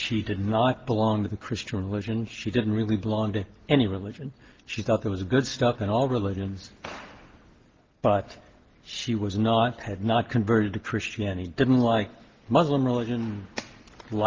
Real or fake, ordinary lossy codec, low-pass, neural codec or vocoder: real; Opus, 16 kbps; 7.2 kHz; none